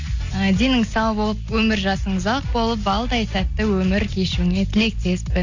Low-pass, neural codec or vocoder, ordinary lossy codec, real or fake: 7.2 kHz; none; AAC, 48 kbps; real